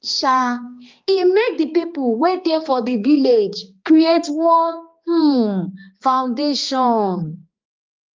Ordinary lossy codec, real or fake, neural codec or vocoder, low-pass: Opus, 24 kbps; fake; codec, 16 kHz, 2 kbps, X-Codec, HuBERT features, trained on balanced general audio; 7.2 kHz